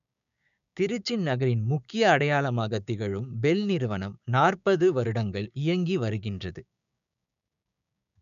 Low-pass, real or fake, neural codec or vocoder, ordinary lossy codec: 7.2 kHz; fake; codec, 16 kHz, 6 kbps, DAC; none